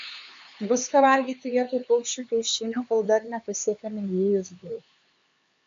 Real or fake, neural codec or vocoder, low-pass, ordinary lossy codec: fake; codec, 16 kHz, 4 kbps, X-Codec, HuBERT features, trained on LibriSpeech; 7.2 kHz; MP3, 48 kbps